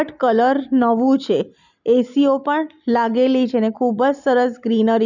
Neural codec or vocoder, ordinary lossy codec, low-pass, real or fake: none; none; 7.2 kHz; real